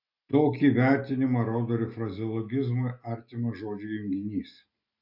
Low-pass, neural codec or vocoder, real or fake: 5.4 kHz; none; real